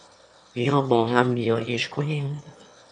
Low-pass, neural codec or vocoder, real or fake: 9.9 kHz; autoencoder, 22.05 kHz, a latent of 192 numbers a frame, VITS, trained on one speaker; fake